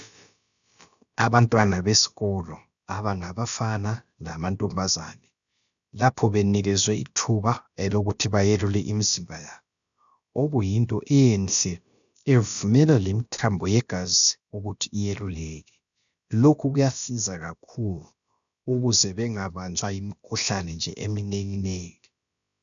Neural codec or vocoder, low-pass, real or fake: codec, 16 kHz, about 1 kbps, DyCAST, with the encoder's durations; 7.2 kHz; fake